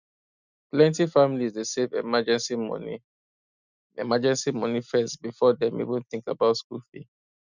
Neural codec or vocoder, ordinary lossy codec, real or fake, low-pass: none; none; real; 7.2 kHz